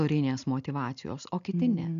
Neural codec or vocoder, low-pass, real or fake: none; 7.2 kHz; real